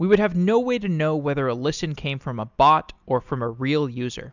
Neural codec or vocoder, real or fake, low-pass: none; real; 7.2 kHz